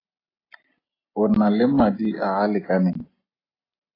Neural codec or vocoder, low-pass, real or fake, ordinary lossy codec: none; 5.4 kHz; real; AAC, 24 kbps